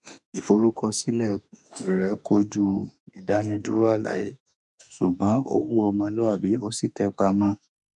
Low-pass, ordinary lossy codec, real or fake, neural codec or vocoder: 10.8 kHz; none; fake; autoencoder, 48 kHz, 32 numbers a frame, DAC-VAE, trained on Japanese speech